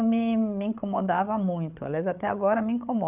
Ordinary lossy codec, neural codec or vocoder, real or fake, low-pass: none; codec, 16 kHz, 8 kbps, FreqCodec, larger model; fake; 3.6 kHz